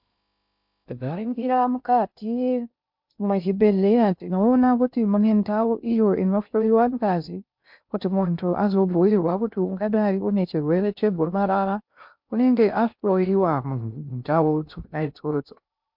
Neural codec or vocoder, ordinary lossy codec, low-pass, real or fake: codec, 16 kHz in and 24 kHz out, 0.6 kbps, FocalCodec, streaming, 2048 codes; MP3, 48 kbps; 5.4 kHz; fake